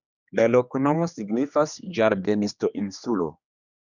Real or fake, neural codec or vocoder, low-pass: fake; codec, 16 kHz, 2 kbps, X-Codec, HuBERT features, trained on general audio; 7.2 kHz